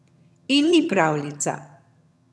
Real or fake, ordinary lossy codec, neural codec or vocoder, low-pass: fake; none; vocoder, 22.05 kHz, 80 mel bands, HiFi-GAN; none